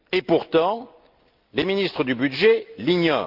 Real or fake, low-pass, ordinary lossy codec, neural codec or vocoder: real; 5.4 kHz; Opus, 32 kbps; none